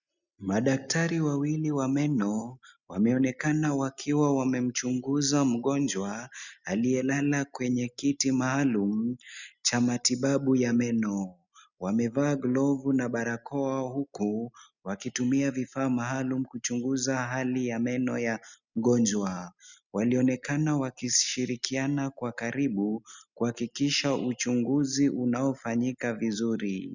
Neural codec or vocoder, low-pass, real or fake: none; 7.2 kHz; real